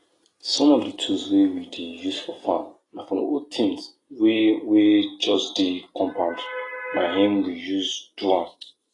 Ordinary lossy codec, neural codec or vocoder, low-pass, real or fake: AAC, 32 kbps; none; 10.8 kHz; real